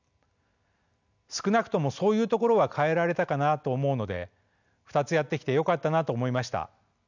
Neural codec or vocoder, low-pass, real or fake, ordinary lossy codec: none; 7.2 kHz; real; none